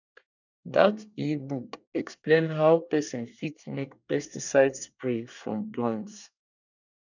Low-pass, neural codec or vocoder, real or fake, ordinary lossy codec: 7.2 kHz; codec, 24 kHz, 1 kbps, SNAC; fake; none